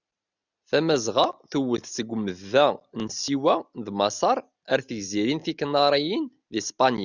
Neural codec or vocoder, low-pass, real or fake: none; 7.2 kHz; real